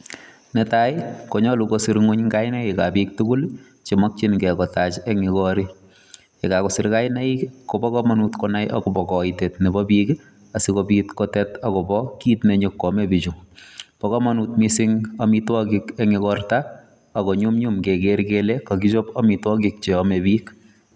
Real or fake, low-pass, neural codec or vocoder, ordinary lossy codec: real; none; none; none